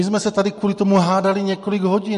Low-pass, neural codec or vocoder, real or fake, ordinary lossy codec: 14.4 kHz; none; real; MP3, 48 kbps